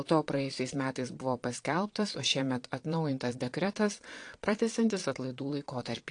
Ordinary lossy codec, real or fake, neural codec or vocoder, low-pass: AAC, 48 kbps; fake; vocoder, 22.05 kHz, 80 mel bands, Vocos; 9.9 kHz